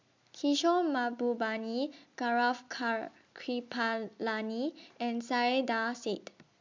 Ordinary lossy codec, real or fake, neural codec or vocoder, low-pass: MP3, 64 kbps; real; none; 7.2 kHz